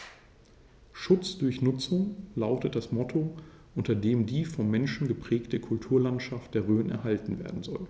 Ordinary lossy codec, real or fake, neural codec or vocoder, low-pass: none; real; none; none